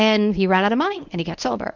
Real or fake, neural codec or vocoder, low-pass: fake; codec, 24 kHz, 0.9 kbps, WavTokenizer, medium speech release version 1; 7.2 kHz